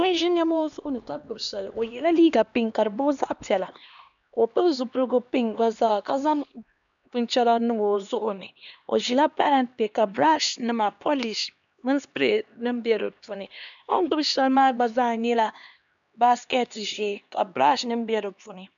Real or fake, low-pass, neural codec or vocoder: fake; 7.2 kHz; codec, 16 kHz, 2 kbps, X-Codec, HuBERT features, trained on LibriSpeech